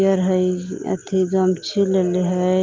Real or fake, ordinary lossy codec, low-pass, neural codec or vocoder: real; Opus, 24 kbps; 7.2 kHz; none